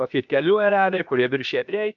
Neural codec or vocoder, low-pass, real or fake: codec, 16 kHz, about 1 kbps, DyCAST, with the encoder's durations; 7.2 kHz; fake